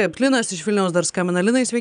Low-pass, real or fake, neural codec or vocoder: 9.9 kHz; real; none